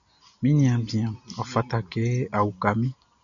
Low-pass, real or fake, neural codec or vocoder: 7.2 kHz; real; none